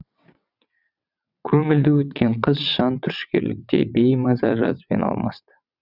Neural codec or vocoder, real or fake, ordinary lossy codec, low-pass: vocoder, 22.05 kHz, 80 mel bands, WaveNeXt; fake; none; 5.4 kHz